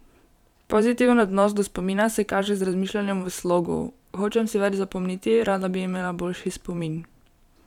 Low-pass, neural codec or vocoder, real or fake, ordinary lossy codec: 19.8 kHz; vocoder, 44.1 kHz, 128 mel bands every 512 samples, BigVGAN v2; fake; none